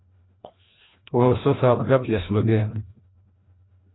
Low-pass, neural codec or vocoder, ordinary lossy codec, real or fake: 7.2 kHz; codec, 16 kHz, 1 kbps, FreqCodec, larger model; AAC, 16 kbps; fake